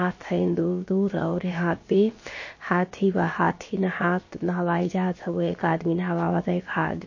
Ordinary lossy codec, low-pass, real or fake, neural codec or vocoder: MP3, 32 kbps; 7.2 kHz; fake; codec, 16 kHz, about 1 kbps, DyCAST, with the encoder's durations